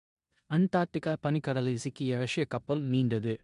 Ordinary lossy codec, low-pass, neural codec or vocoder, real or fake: MP3, 64 kbps; 10.8 kHz; codec, 16 kHz in and 24 kHz out, 0.9 kbps, LongCat-Audio-Codec, four codebook decoder; fake